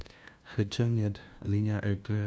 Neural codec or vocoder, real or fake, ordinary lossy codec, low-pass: codec, 16 kHz, 0.5 kbps, FunCodec, trained on LibriTTS, 25 frames a second; fake; none; none